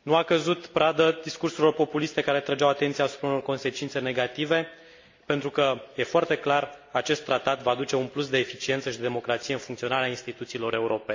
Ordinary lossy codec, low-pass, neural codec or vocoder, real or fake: MP3, 48 kbps; 7.2 kHz; none; real